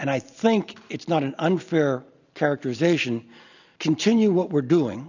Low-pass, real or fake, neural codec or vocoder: 7.2 kHz; real; none